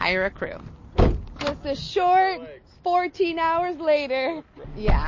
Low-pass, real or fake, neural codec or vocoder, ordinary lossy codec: 7.2 kHz; real; none; MP3, 32 kbps